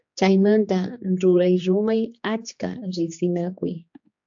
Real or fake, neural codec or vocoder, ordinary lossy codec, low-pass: fake; codec, 16 kHz, 2 kbps, X-Codec, HuBERT features, trained on general audio; AAC, 64 kbps; 7.2 kHz